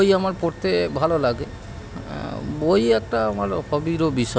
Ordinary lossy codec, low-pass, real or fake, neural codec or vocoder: none; none; real; none